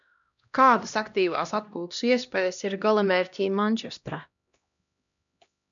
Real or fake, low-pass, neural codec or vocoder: fake; 7.2 kHz; codec, 16 kHz, 1 kbps, X-Codec, HuBERT features, trained on LibriSpeech